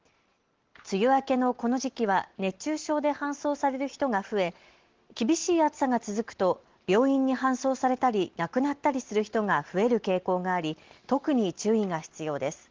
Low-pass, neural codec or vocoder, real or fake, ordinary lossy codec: 7.2 kHz; none; real; Opus, 16 kbps